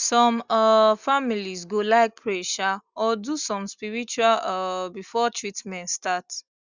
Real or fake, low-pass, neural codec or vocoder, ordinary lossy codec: real; 7.2 kHz; none; Opus, 64 kbps